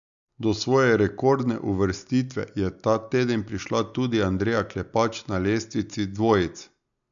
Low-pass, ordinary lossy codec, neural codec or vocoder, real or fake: 7.2 kHz; none; none; real